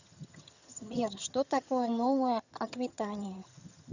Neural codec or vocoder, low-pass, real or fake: vocoder, 22.05 kHz, 80 mel bands, HiFi-GAN; 7.2 kHz; fake